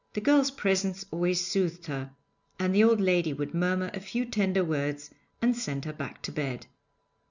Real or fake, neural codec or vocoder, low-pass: real; none; 7.2 kHz